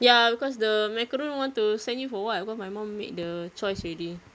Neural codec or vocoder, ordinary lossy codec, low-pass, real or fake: none; none; none; real